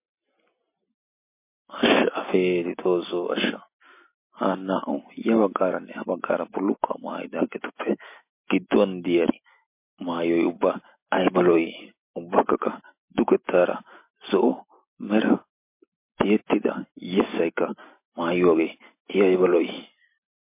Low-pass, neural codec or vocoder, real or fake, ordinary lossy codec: 3.6 kHz; none; real; MP3, 24 kbps